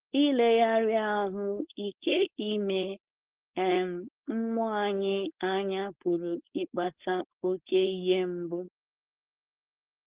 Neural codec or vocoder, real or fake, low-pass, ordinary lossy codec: codec, 16 kHz, 4.8 kbps, FACodec; fake; 3.6 kHz; Opus, 16 kbps